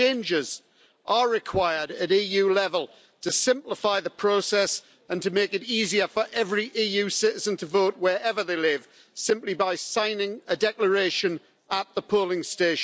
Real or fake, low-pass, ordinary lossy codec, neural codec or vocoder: real; none; none; none